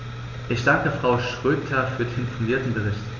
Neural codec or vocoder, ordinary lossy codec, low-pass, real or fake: none; none; 7.2 kHz; real